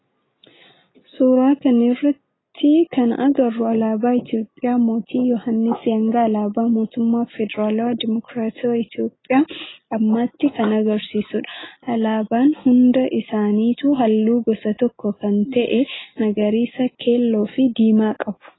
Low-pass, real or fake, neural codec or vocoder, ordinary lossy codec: 7.2 kHz; real; none; AAC, 16 kbps